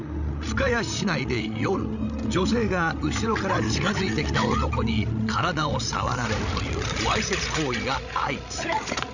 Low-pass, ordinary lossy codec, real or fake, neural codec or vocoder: 7.2 kHz; none; fake; codec, 16 kHz, 16 kbps, FreqCodec, larger model